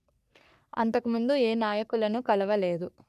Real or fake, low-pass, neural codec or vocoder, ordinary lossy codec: fake; 14.4 kHz; codec, 44.1 kHz, 3.4 kbps, Pupu-Codec; none